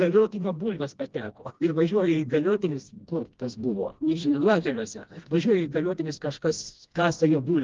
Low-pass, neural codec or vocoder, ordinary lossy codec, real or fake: 7.2 kHz; codec, 16 kHz, 1 kbps, FreqCodec, smaller model; Opus, 32 kbps; fake